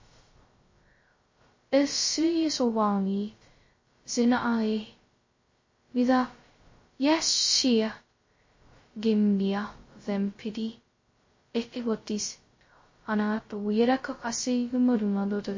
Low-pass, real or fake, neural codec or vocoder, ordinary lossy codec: 7.2 kHz; fake; codec, 16 kHz, 0.2 kbps, FocalCodec; MP3, 32 kbps